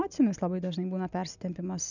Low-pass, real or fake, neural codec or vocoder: 7.2 kHz; real; none